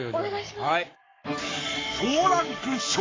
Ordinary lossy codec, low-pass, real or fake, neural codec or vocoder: AAC, 32 kbps; 7.2 kHz; fake; codec, 44.1 kHz, 7.8 kbps, Pupu-Codec